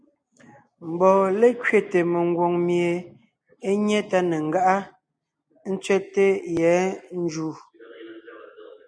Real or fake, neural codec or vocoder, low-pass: real; none; 9.9 kHz